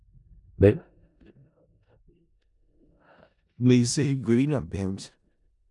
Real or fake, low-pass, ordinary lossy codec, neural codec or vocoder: fake; 10.8 kHz; MP3, 96 kbps; codec, 16 kHz in and 24 kHz out, 0.4 kbps, LongCat-Audio-Codec, four codebook decoder